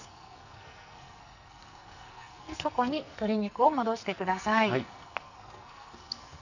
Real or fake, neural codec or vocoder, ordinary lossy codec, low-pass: fake; codec, 44.1 kHz, 2.6 kbps, SNAC; none; 7.2 kHz